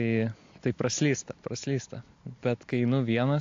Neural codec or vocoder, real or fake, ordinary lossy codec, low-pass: none; real; AAC, 48 kbps; 7.2 kHz